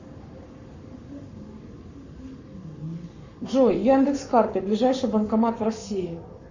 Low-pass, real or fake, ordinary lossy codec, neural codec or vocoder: 7.2 kHz; fake; Opus, 64 kbps; codec, 44.1 kHz, 7.8 kbps, Pupu-Codec